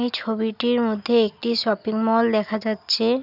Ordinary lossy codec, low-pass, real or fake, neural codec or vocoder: none; 5.4 kHz; real; none